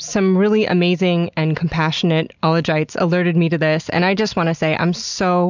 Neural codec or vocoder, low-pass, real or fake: none; 7.2 kHz; real